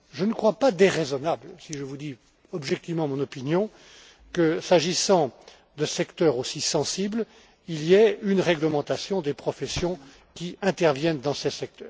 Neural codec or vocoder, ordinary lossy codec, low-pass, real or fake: none; none; none; real